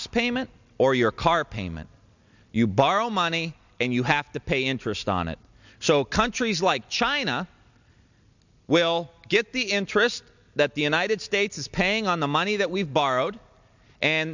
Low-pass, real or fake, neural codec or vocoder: 7.2 kHz; real; none